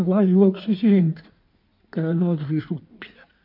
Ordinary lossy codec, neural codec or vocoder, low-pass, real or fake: none; codec, 16 kHz in and 24 kHz out, 1.1 kbps, FireRedTTS-2 codec; 5.4 kHz; fake